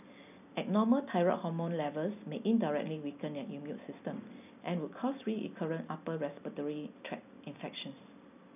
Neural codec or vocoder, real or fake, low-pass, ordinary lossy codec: none; real; 3.6 kHz; none